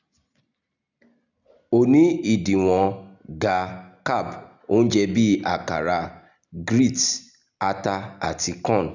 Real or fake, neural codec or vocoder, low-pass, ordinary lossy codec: real; none; 7.2 kHz; none